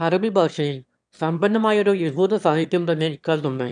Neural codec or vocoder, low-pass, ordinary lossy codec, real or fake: autoencoder, 22.05 kHz, a latent of 192 numbers a frame, VITS, trained on one speaker; 9.9 kHz; none; fake